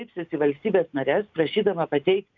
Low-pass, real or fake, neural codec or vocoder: 7.2 kHz; real; none